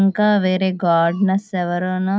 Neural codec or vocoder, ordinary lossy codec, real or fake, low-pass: none; none; real; none